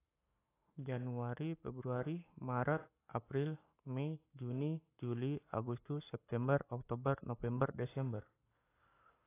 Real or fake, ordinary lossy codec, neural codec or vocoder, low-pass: fake; AAC, 24 kbps; codec, 44.1 kHz, 7.8 kbps, Pupu-Codec; 3.6 kHz